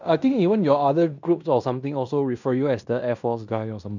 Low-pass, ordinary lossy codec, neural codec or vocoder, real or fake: 7.2 kHz; none; codec, 16 kHz in and 24 kHz out, 0.9 kbps, LongCat-Audio-Codec, fine tuned four codebook decoder; fake